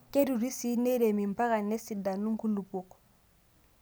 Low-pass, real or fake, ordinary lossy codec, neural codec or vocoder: none; real; none; none